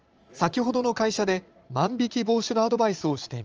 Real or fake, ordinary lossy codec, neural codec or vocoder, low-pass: fake; Opus, 24 kbps; vocoder, 22.05 kHz, 80 mel bands, Vocos; 7.2 kHz